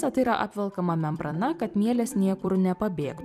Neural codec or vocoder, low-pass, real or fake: vocoder, 44.1 kHz, 128 mel bands every 256 samples, BigVGAN v2; 14.4 kHz; fake